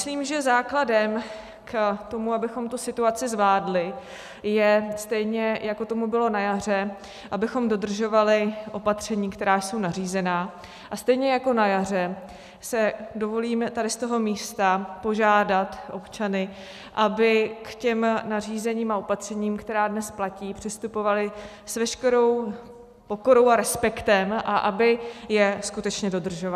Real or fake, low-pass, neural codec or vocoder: real; 14.4 kHz; none